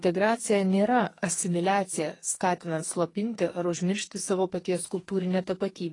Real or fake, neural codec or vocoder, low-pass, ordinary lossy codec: fake; codec, 44.1 kHz, 2.6 kbps, SNAC; 10.8 kHz; AAC, 32 kbps